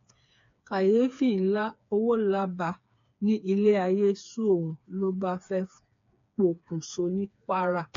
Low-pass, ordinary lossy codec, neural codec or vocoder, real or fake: 7.2 kHz; AAC, 48 kbps; codec, 16 kHz, 4 kbps, FreqCodec, smaller model; fake